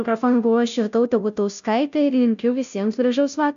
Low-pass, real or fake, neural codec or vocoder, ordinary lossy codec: 7.2 kHz; fake; codec, 16 kHz, 0.5 kbps, FunCodec, trained on Chinese and English, 25 frames a second; AAC, 96 kbps